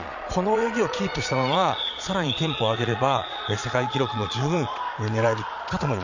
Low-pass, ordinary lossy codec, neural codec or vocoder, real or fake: 7.2 kHz; none; vocoder, 22.05 kHz, 80 mel bands, Vocos; fake